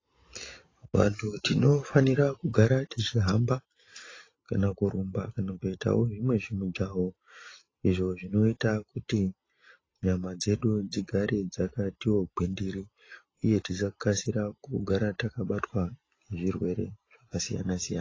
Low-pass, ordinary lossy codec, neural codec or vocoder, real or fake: 7.2 kHz; AAC, 32 kbps; none; real